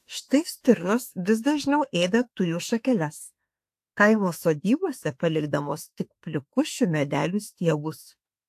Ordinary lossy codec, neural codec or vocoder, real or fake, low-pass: AAC, 64 kbps; autoencoder, 48 kHz, 32 numbers a frame, DAC-VAE, trained on Japanese speech; fake; 14.4 kHz